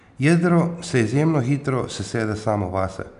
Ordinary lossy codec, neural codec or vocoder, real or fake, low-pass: none; none; real; 10.8 kHz